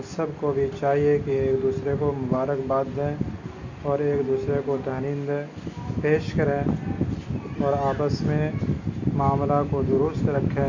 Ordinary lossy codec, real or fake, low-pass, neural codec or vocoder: Opus, 64 kbps; real; 7.2 kHz; none